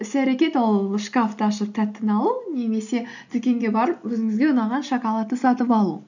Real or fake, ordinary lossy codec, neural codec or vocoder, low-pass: real; none; none; 7.2 kHz